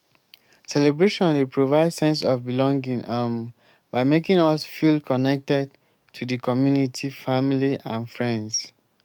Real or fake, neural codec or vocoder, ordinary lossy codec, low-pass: fake; codec, 44.1 kHz, 7.8 kbps, DAC; MP3, 96 kbps; 19.8 kHz